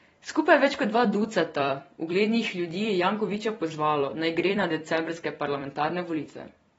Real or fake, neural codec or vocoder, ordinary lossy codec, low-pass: fake; vocoder, 44.1 kHz, 128 mel bands every 256 samples, BigVGAN v2; AAC, 24 kbps; 19.8 kHz